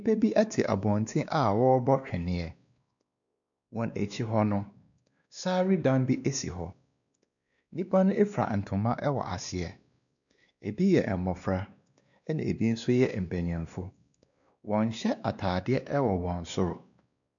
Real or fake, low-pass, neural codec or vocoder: fake; 7.2 kHz; codec, 16 kHz, 2 kbps, X-Codec, WavLM features, trained on Multilingual LibriSpeech